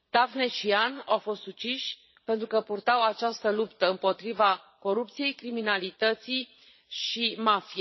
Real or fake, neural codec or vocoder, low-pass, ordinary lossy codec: fake; vocoder, 22.05 kHz, 80 mel bands, Vocos; 7.2 kHz; MP3, 24 kbps